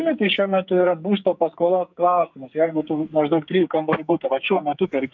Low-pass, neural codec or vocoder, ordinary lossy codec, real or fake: 7.2 kHz; codec, 44.1 kHz, 2.6 kbps, SNAC; MP3, 64 kbps; fake